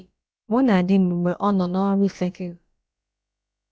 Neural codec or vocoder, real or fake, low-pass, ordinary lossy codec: codec, 16 kHz, about 1 kbps, DyCAST, with the encoder's durations; fake; none; none